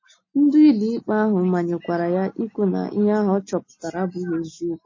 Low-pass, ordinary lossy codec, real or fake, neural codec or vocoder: 7.2 kHz; MP3, 32 kbps; real; none